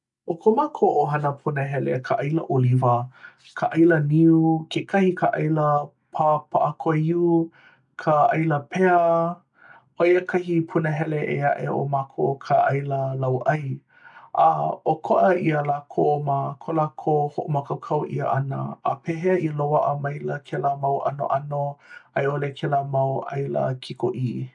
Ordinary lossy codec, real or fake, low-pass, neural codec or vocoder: none; real; 10.8 kHz; none